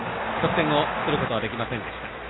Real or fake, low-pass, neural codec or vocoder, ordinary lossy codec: real; 7.2 kHz; none; AAC, 16 kbps